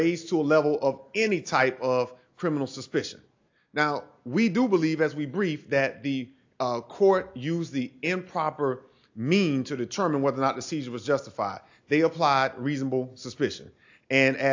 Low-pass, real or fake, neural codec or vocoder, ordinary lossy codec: 7.2 kHz; real; none; AAC, 48 kbps